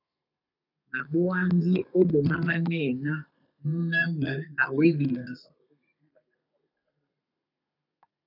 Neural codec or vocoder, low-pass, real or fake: codec, 32 kHz, 1.9 kbps, SNAC; 5.4 kHz; fake